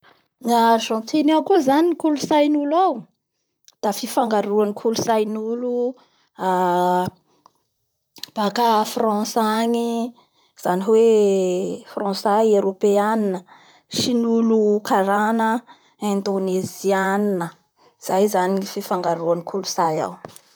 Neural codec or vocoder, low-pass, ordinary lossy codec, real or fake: vocoder, 44.1 kHz, 128 mel bands, Pupu-Vocoder; none; none; fake